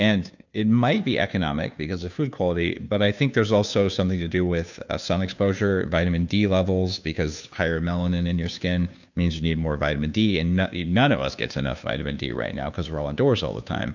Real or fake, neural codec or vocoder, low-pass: fake; codec, 16 kHz, 2 kbps, FunCodec, trained on Chinese and English, 25 frames a second; 7.2 kHz